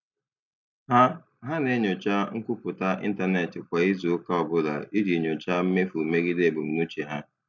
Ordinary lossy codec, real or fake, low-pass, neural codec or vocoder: none; real; 7.2 kHz; none